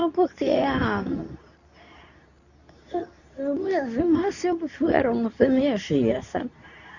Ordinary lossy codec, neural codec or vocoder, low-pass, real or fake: none; codec, 24 kHz, 0.9 kbps, WavTokenizer, medium speech release version 2; 7.2 kHz; fake